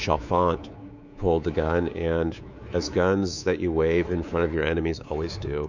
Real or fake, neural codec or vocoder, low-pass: fake; codec, 24 kHz, 3.1 kbps, DualCodec; 7.2 kHz